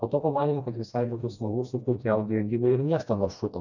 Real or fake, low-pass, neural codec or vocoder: fake; 7.2 kHz; codec, 16 kHz, 1 kbps, FreqCodec, smaller model